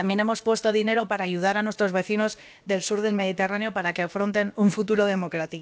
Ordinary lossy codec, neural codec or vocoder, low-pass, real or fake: none; codec, 16 kHz, about 1 kbps, DyCAST, with the encoder's durations; none; fake